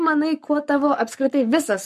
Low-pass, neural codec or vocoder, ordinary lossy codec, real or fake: 14.4 kHz; codec, 44.1 kHz, 7.8 kbps, Pupu-Codec; MP3, 64 kbps; fake